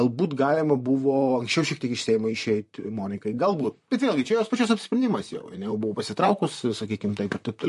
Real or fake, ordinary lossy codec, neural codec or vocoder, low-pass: fake; MP3, 48 kbps; vocoder, 44.1 kHz, 128 mel bands, Pupu-Vocoder; 14.4 kHz